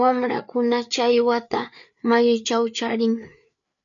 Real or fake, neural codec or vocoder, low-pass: fake; codec, 16 kHz, 4 kbps, FreqCodec, larger model; 7.2 kHz